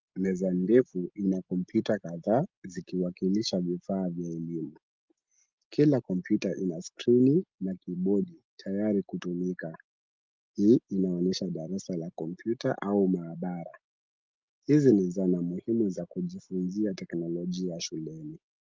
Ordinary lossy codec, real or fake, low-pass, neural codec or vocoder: Opus, 32 kbps; real; 7.2 kHz; none